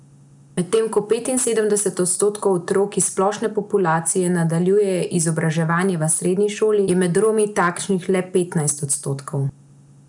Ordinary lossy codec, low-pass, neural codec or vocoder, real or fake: none; 10.8 kHz; none; real